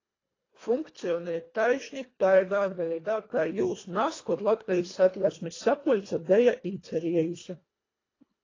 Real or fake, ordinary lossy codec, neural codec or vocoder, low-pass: fake; AAC, 32 kbps; codec, 24 kHz, 1.5 kbps, HILCodec; 7.2 kHz